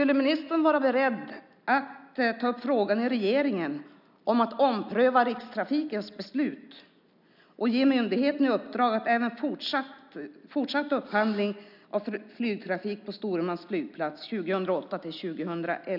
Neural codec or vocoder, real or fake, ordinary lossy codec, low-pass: none; real; none; 5.4 kHz